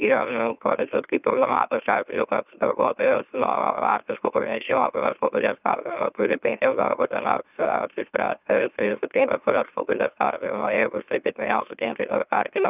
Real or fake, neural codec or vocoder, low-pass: fake; autoencoder, 44.1 kHz, a latent of 192 numbers a frame, MeloTTS; 3.6 kHz